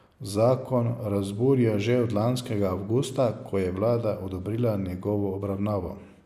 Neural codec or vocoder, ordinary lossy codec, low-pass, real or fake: none; none; 14.4 kHz; real